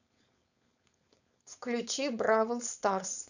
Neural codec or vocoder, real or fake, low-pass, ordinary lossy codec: codec, 16 kHz, 4.8 kbps, FACodec; fake; 7.2 kHz; none